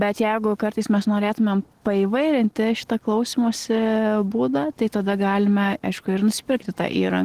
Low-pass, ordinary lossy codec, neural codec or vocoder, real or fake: 14.4 kHz; Opus, 16 kbps; none; real